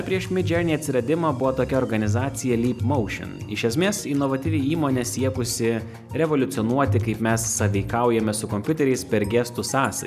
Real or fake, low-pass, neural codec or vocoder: real; 14.4 kHz; none